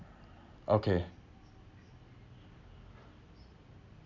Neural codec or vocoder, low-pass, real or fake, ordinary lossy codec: none; 7.2 kHz; real; none